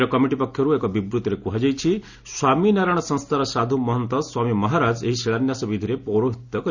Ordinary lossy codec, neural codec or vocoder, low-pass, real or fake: none; none; 7.2 kHz; real